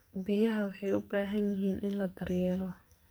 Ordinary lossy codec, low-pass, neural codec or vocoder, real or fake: none; none; codec, 44.1 kHz, 2.6 kbps, SNAC; fake